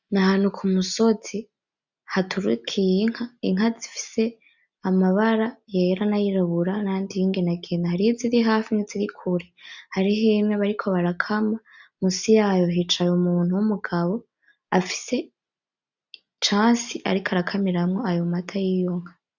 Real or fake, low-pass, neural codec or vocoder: real; 7.2 kHz; none